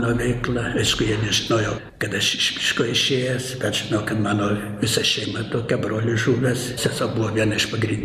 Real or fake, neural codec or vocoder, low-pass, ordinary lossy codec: real; none; 14.4 kHz; MP3, 96 kbps